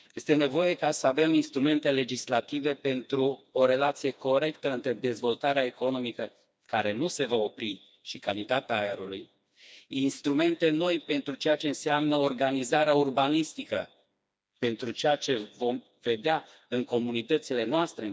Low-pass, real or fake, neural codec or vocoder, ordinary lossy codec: none; fake; codec, 16 kHz, 2 kbps, FreqCodec, smaller model; none